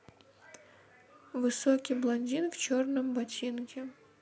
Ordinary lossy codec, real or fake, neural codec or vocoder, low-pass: none; real; none; none